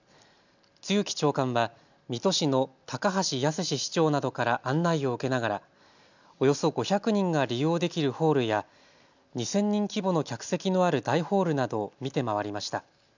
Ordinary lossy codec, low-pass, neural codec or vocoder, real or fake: none; 7.2 kHz; none; real